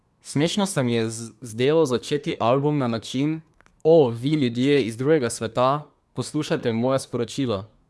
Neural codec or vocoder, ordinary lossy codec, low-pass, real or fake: codec, 24 kHz, 1 kbps, SNAC; none; none; fake